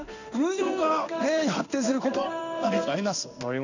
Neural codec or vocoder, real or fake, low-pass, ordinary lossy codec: codec, 16 kHz in and 24 kHz out, 1 kbps, XY-Tokenizer; fake; 7.2 kHz; none